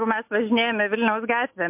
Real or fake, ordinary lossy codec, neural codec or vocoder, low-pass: real; MP3, 32 kbps; none; 3.6 kHz